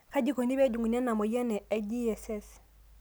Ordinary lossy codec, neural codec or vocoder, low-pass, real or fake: none; none; none; real